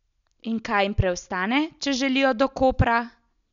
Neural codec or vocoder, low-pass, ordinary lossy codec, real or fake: none; 7.2 kHz; none; real